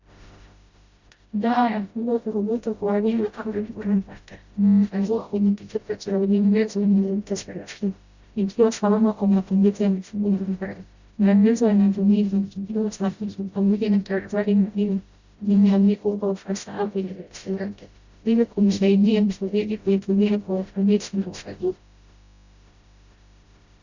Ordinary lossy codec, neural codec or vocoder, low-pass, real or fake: Opus, 64 kbps; codec, 16 kHz, 0.5 kbps, FreqCodec, smaller model; 7.2 kHz; fake